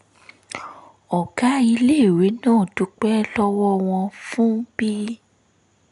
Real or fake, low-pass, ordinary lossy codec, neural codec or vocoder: real; 10.8 kHz; none; none